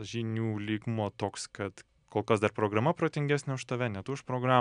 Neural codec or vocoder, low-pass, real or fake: none; 9.9 kHz; real